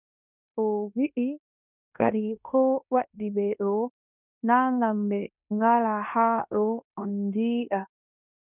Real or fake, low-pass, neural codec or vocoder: fake; 3.6 kHz; codec, 16 kHz in and 24 kHz out, 0.9 kbps, LongCat-Audio-Codec, fine tuned four codebook decoder